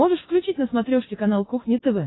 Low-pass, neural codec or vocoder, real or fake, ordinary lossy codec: 7.2 kHz; none; real; AAC, 16 kbps